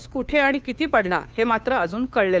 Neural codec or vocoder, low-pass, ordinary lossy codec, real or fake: codec, 16 kHz, 2 kbps, FunCodec, trained on Chinese and English, 25 frames a second; none; none; fake